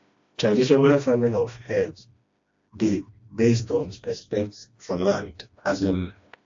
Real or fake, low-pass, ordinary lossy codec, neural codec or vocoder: fake; 7.2 kHz; AAC, 48 kbps; codec, 16 kHz, 1 kbps, FreqCodec, smaller model